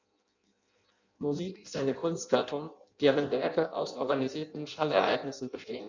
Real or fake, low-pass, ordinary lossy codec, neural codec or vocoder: fake; 7.2 kHz; none; codec, 16 kHz in and 24 kHz out, 0.6 kbps, FireRedTTS-2 codec